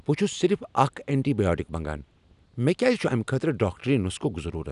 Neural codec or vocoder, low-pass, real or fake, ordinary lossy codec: none; 10.8 kHz; real; none